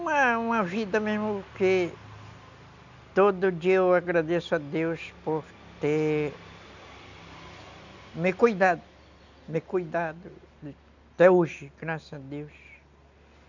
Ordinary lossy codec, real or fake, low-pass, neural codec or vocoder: none; real; 7.2 kHz; none